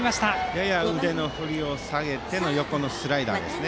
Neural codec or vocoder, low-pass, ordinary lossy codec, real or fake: none; none; none; real